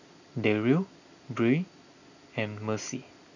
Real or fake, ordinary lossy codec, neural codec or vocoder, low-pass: real; none; none; 7.2 kHz